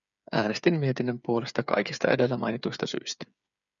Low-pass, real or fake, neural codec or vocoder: 7.2 kHz; fake; codec, 16 kHz, 8 kbps, FreqCodec, smaller model